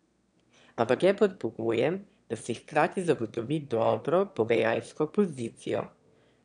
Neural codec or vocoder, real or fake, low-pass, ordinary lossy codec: autoencoder, 22.05 kHz, a latent of 192 numbers a frame, VITS, trained on one speaker; fake; 9.9 kHz; none